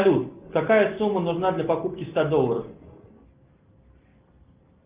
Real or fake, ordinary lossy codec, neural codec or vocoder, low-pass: real; Opus, 32 kbps; none; 3.6 kHz